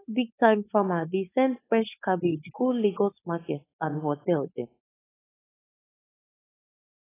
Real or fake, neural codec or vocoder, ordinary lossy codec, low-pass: fake; codec, 16 kHz, 4.8 kbps, FACodec; AAC, 16 kbps; 3.6 kHz